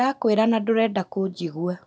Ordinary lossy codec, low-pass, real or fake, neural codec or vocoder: none; none; real; none